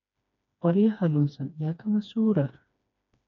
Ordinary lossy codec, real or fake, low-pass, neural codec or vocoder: none; fake; 7.2 kHz; codec, 16 kHz, 2 kbps, FreqCodec, smaller model